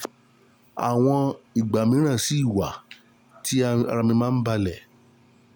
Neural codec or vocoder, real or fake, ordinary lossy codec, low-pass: none; real; none; none